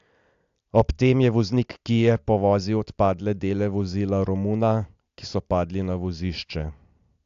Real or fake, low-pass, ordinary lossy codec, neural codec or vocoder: real; 7.2 kHz; AAC, 64 kbps; none